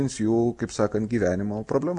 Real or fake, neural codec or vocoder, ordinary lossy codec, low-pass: real; none; AAC, 64 kbps; 10.8 kHz